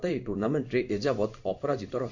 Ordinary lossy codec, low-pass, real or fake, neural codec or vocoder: none; 7.2 kHz; fake; codec, 16 kHz in and 24 kHz out, 1 kbps, XY-Tokenizer